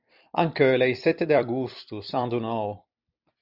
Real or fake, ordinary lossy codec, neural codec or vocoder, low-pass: fake; Opus, 64 kbps; vocoder, 44.1 kHz, 128 mel bands every 256 samples, BigVGAN v2; 5.4 kHz